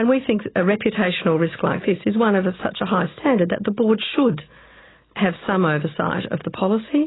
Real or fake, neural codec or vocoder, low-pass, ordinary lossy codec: real; none; 7.2 kHz; AAC, 16 kbps